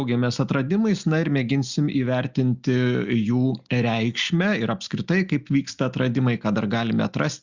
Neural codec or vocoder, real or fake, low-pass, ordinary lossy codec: none; real; 7.2 kHz; Opus, 64 kbps